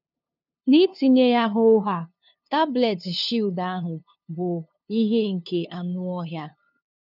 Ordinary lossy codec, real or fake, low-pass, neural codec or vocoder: none; fake; 5.4 kHz; codec, 16 kHz, 2 kbps, FunCodec, trained on LibriTTS, 25 frames a second